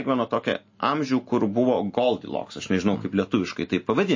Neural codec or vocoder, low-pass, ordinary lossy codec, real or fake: none; 7.2 kHz; MP3, 32 kbps; real